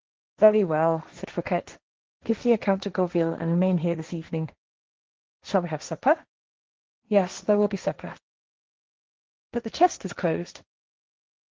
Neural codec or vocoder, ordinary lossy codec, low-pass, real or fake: codec, 16 kHz, 1.1 kbps, Voila-Tokenizer; Opus, 16 kbps; 7.2 kHz; fake